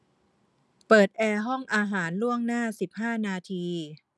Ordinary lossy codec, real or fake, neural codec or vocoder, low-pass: none; real; none; none